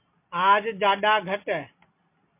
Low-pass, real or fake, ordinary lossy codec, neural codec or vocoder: 3.6 kHz; real; MP3, 24 kbps; none